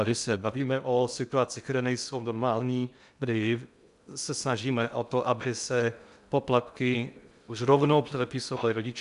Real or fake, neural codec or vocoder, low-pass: fake; codec, 16 kHz in and 24 kHz out, 0.6 kbps, FocalCodec, streaming, 2048 codes; 10.8 kHz